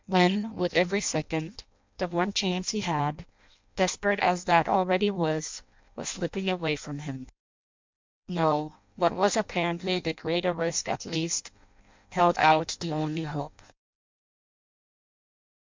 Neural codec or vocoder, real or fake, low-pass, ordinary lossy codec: codec, 16 kHz in and 24 kHz out, 0.6 kbps, FireRedTTS-2 codec; fake; 7.2 kHz; MP3, 64 kbps